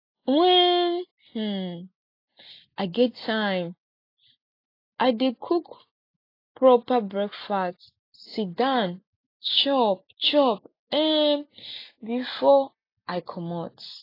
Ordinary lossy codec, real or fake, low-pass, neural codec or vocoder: AAC, 32 kbps; real; 5.4 kHz; none